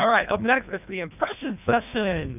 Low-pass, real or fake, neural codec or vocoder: 3.6 kHz; fake; codec, 24 kHz, 1.5 kbps, HILCodec